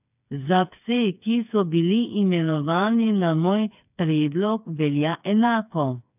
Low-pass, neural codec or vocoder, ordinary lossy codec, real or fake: 3.6 kHz; codec, 16 kHz, 4 kbps, FreqCodec, smaller model; none; fake